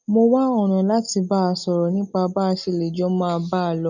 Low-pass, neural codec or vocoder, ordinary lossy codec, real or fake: 7.2 kHz; none; none; real